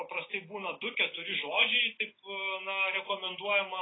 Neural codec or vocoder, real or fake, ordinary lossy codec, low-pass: none; real; AAC, 16 kbps; 7.2 kHz